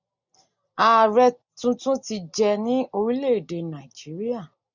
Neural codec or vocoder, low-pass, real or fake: none; 7.2 kHz; real